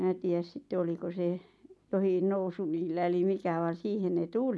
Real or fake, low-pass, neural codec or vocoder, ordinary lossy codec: real; none; none; none